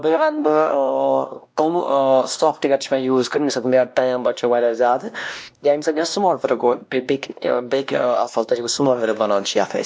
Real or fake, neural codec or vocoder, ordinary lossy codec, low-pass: fake; codec, 16 kHz, 1 kbps, X-Codec, WavLM features, trained on Multilingual LibriSpeech; none; none